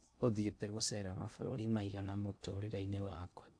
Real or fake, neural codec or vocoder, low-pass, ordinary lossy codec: fake; codec, 16 kHz in and 24 kHz out, 0.6 kbps, FocalCodec, streaming, 2048 codes; 9.9 kHz; AAC, 64 kbps